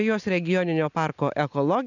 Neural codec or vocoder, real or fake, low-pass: none; real; 7.2 kHz